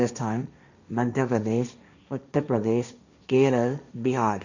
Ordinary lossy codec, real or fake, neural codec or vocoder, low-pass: none; fake; codec, 16 kHz, 1.1 kbps, Voila-Tokenizer; 7.2 kHz